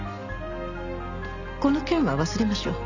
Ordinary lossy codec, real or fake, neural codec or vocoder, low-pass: none; real; none; 7.2 kHz